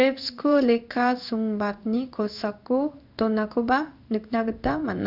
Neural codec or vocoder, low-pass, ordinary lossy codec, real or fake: codec, 16 kHz in and 24 kHz out, 1 kbps, XY-Tokenizer; 5.4 kHz; none; fake